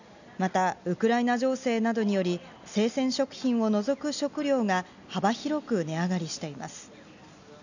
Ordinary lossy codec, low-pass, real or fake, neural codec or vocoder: none; 7.2 kHz; real; none